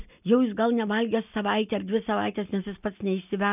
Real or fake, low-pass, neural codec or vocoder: real; 3.6 kHz; none